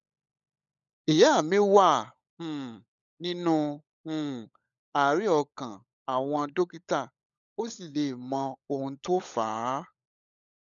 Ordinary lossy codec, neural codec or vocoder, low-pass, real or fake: none; codec, 16 kHz, 16 kbps, FunCodec, trained on LibriTTS, 50 frames a second; 7.2 kHz; fake